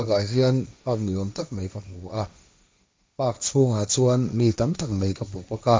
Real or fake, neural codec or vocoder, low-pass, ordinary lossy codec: fake; codec, 16 kHz, 1.1 kbps, Voila-Tokenizer; none; none